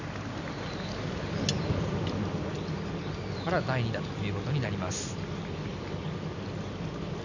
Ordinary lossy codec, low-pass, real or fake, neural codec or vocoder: none; 7.2 kHz; real; none